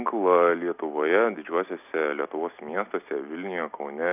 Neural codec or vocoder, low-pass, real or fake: none; 3.6 kHz; real